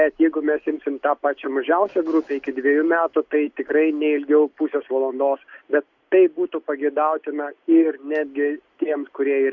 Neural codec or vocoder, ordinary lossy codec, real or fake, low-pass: none; Opus, 64 kbps; real; 7.2 kHz